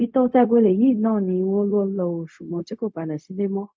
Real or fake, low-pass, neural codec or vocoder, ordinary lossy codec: fake; none; codec, 16 kHz, 0.4 kbps, LongCat-Audio-Codec; none